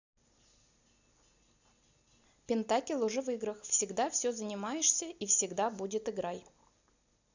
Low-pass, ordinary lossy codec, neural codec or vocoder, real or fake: 7.2 kHz; none; none; real